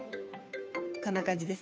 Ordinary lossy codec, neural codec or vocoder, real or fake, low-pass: none; codec, 16 kHz, 2 kbps, FunCodec, trained on Chinese and English, 25 frames a second; fake; none